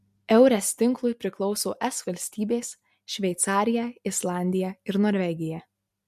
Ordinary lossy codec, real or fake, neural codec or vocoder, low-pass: MP3, 64 kbps; real; none; 14.4 kHz